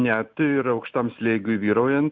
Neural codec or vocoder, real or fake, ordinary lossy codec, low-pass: none; real; Opus, 64 kbps; 7.2 kHz